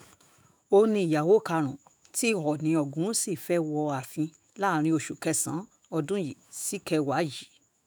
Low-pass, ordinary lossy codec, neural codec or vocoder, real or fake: none; none; autoencoder, 48 kHz, 128 numbers a frame, DAC-VAE, trained on Japanese speech; fake